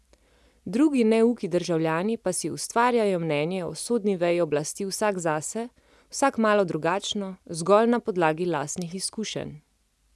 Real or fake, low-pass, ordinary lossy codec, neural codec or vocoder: real; none; none; none